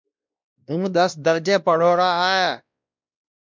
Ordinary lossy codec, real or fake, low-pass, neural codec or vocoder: MP3, 64 kbps; fake; 7.2 kHz; codec, 16 kHz, 1 kbps, X-Codec, WavLM features, trained on Multilingual LibriSpeech